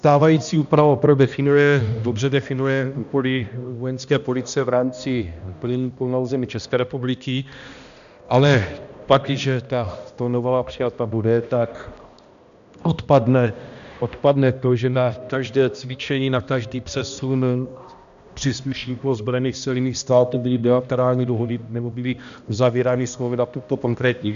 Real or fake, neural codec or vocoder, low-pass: fake; codec, 16 kHz, 1 kbps, X-Codec, HuBERT features, trained on balanced general audio; 7.2 kHz